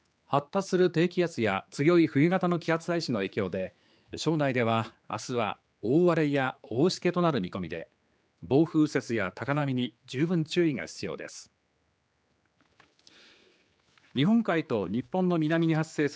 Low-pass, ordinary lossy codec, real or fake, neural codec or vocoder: none; none; fake; codec, 16 kHz, 4 kbps, X-Codec, HuBERT features, trained on general audio